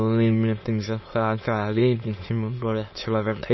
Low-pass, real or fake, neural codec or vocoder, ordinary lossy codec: 7.2 kHz; fake; autoencoder, 22.05 kHz, a latent of 192 numbers a frame, VITS, trained on many speakers; MP3, 24 kbps